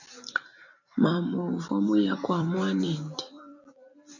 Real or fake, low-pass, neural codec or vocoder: fake; 7.2 kHz; vocoder, 44.1 kHz, 128 mel bands every 256 samples, BigVGAN v2